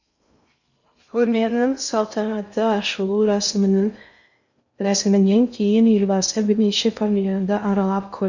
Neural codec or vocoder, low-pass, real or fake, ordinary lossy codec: codec, 16 kHz in and 24 kHz out, 0.6 kbps, FocalCodec, streaming, 2048 codes; 7.2 kHz; fake; none